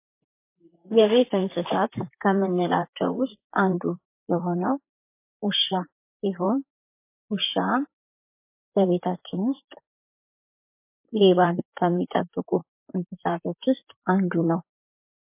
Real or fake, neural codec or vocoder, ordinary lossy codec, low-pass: fake; vocoder, 44.1 kHz, 128 mel bands, Pupu-Vocoder; MP3, 24 kbps; 3.6 kHz